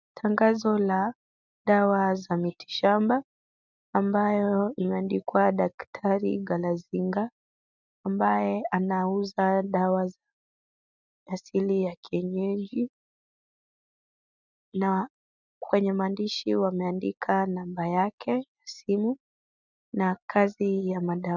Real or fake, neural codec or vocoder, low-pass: real; none; 7.2 kHz